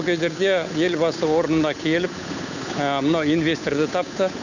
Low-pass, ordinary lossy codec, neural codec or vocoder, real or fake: 7.2 kHz; none; codec, 16 kHz, 8 kbps, FunCodec, trained on Chinese and English, 25 frames a second; fake